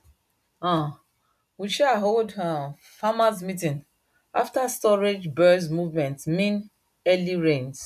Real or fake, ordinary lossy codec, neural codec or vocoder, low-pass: real; none; none; 14.4 kHz